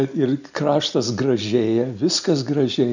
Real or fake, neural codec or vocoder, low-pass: real; none; 7.2 kHz